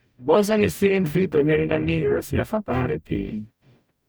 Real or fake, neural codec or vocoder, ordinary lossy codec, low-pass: fake; codec, 44.1 kHz, 0.9 kbps, DAC; none; none